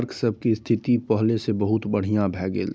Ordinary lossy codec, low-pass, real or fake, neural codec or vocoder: none; none; real; none